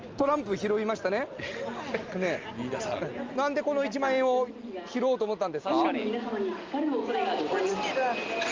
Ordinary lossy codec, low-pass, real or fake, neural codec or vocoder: Opus, 24 kbps; 7.2 kHz; real; none